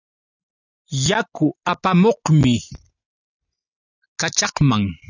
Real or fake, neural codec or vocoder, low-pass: real; none; 7.2 kHz